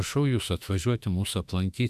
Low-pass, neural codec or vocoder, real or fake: 14.4 kHz; autoencoder, 48 kHz, 32 numbers a frame, DAC-VAE, trained on Japanese speech; fake